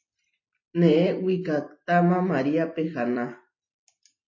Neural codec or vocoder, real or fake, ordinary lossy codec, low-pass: vocoder, 44.1 kHz, 128 mel bands every 256 samples, BigVGAN v2; fake; MP3, 32 kbps; 7.2 kHz